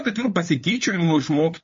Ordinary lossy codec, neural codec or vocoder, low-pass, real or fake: MP3, 32 kbps; codec, 16 kHz, 2 kbps, FunCodec, trained on LibriTTS, 25 frames a second; 7.2 kHz; fake